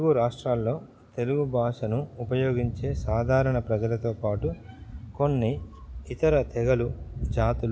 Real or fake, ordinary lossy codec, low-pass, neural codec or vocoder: real; none; none; none